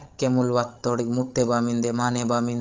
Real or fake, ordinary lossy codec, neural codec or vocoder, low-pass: fake; Opus, 32 kbps; codec, 16 kHz, 16 kbps, FreqCodec, larger model; 7.2 kHz